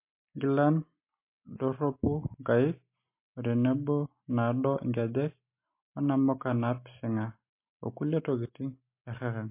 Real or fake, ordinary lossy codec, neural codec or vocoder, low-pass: real; MP3, 24 kbps; none; 3.6 kHz